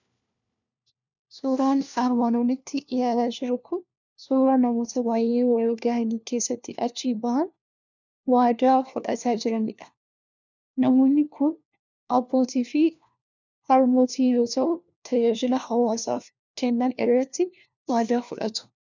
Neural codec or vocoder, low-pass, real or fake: codec, 16 kHz, 1 kbps, FunCodec, trained on LibriTTS, 50 frames a second; 7.2 kHz; fake